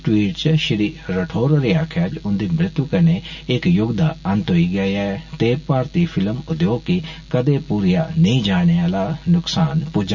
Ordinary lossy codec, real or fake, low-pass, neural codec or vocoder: none; real; 7.2 kHz; none